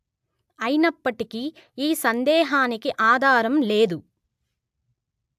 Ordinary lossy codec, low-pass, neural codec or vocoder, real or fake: AAC, 96 kbps; 14.4 kHz; none; real